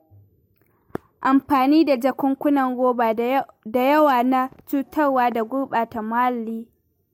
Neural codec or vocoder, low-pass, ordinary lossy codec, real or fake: none; 19.8 kHz; MP3, 64 kbps; real